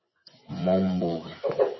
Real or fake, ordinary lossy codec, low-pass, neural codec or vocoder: fake; MP3, 24 kbps; 7.2 kHz; vocoder, 24 kHz, 100 mel bands, Vocos